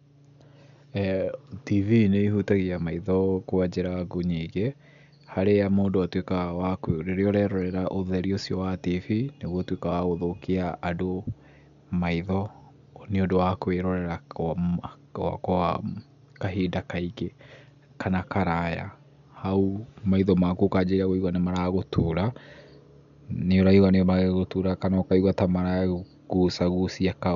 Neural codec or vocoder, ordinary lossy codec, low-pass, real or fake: none; none; 7.2 kHz; real